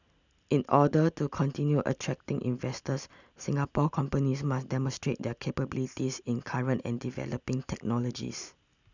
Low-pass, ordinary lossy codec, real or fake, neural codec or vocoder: 7.2 kHz; none; real; none